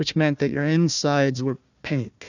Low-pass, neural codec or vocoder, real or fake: 7.2 kHz; codec, 16 kHz, 1 kbps, FunCodec, trained on Chinese and English, 50 frames a second; fake